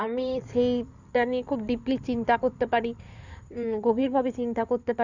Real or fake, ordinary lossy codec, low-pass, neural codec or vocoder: fake; none; 7.2 kHz; codec, 16 kHz in and 24 kHz out, 2.2 kbps, FireRedTTS-2 codec